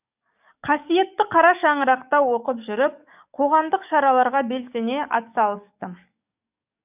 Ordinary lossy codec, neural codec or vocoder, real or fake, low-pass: none; codec, 44.1 kHz, 7.8 kbps, DAC; fake; 3.6 kHz